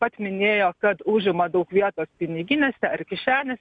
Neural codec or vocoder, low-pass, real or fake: none; 9.9 kHz; real